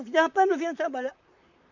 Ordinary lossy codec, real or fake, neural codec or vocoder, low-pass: none; fake; vocoder, 44.1 kHz, 128 mel bands, Pupu-Vocoder; 7.2 kHz